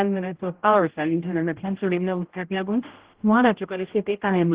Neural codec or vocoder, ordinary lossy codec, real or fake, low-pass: codec, 16 kHz, 0.5 kbps, X-Codec, HuBERT features, trained on general audio; Opus, 16 kbps; fake; 3.6 kHz